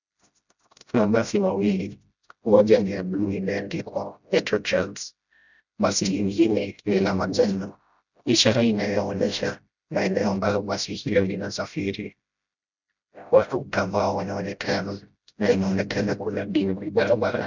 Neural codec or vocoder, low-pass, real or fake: codec, 16 kHz, 0.5 kbps, FreqCodec, smaller model; 7.2 kHz; fake